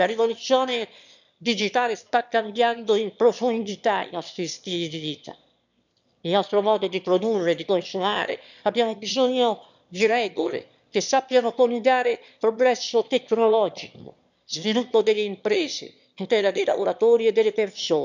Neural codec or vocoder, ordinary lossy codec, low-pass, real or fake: autoencoder, 22.05 kHz, a latent of 192 numbers a frame, VITS, trained on one speaker; none; 7.2 kHz; fake